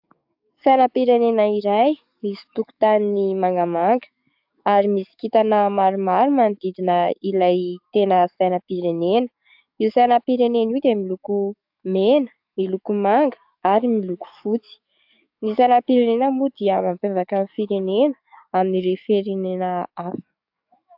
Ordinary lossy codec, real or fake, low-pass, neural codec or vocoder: AAC, 48 kbps; fake; 5.4 kHz; codec, 44.1 kHz, 7.8 kbps, DAC